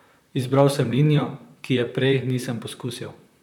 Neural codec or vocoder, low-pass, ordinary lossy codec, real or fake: vocoder, 44.1 kHz, 128 mel bands, Pupu-Vocoder; 19.8 kHz; none; fake